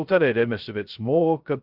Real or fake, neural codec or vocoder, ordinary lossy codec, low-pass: fake; codec, 16 kHz, 0.2 kbps, FocalCodec; Opus, 32 kbps; 5.4 kHz